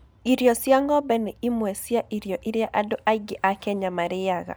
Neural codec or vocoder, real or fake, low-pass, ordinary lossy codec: none; real; none; none